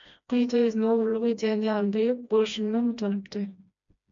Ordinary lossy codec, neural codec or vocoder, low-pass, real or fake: MP3, 64 kbps; codec, 16 kHz, 1 kbps, FreqCodec, smaller model; 7.2 kHz; fake